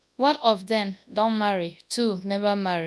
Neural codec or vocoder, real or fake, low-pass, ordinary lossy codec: codec, 24 kHz, 0.9 kbps, WavTokenizer, large speech release; fake; none; none